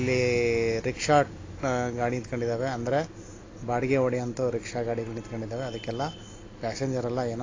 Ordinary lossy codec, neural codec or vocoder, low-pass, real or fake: AAC, 32 kbps; none; 7.2 kHz; real